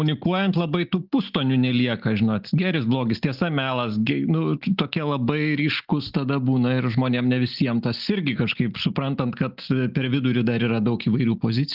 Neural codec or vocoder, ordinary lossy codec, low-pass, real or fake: none; Opus, 32 kbps; 5.4 kHz; real